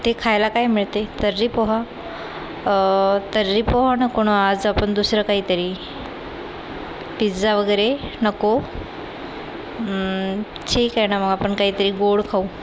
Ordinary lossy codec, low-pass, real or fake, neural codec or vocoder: none; none; real; none